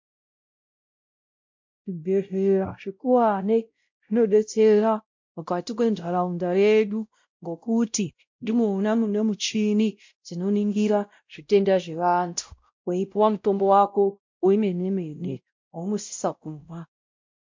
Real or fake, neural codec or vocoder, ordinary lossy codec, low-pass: fake; codec, 16 kHz, 0.5 kbps, X-Codec, WavLM features, trained on Multilingual LibriSpeech; MP3, 48 kbps; 7.2 kHz